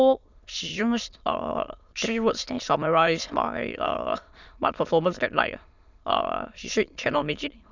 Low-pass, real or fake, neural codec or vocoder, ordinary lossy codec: 7.2 kHz; fake; autoencoder, 22.05 kHz, a latent of 192 numbers a frame, VITS, trained on many speakers; none